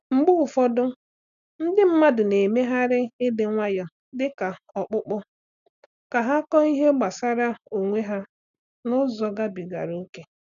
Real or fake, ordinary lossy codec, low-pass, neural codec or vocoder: real; none; 7.2 kHz; none